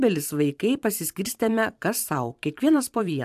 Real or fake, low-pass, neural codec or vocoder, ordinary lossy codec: fake; 14.4 kHz; vocoder, 44.1 kHz, 128 mel bands every 512 samples, BigVGAN v2; AAC, 96 kbps